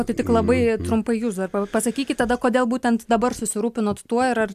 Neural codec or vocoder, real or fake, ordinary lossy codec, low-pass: none; real; AAC, 96 kbps; 14.4 kHz